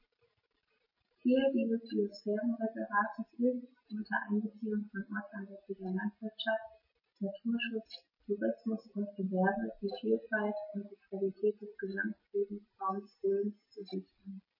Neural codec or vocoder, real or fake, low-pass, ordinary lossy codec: none; real; 5.4 kHz; MP3, 24 kbps